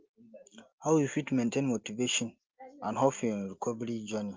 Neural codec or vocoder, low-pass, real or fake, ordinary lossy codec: none; 7.2 kHz; real; Opus, 24 kbps